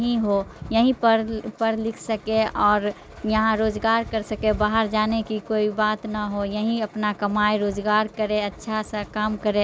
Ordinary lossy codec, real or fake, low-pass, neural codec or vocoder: none; real; none; none